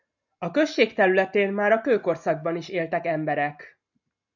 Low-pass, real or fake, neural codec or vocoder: 7.2 kHz; real; none